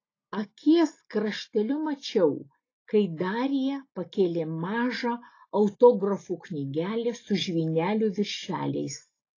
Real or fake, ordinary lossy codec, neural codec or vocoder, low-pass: real; AAC, 32 kbps; none; 7.2 kHz